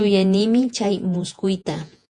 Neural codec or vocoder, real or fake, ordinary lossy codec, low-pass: vocoder, 48 kHz, 128 mel bands, Vocos; fake; MP3, 64 kbps; 10.8 kHz